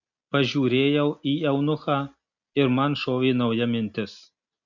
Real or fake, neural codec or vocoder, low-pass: real; none; 7.2 kHz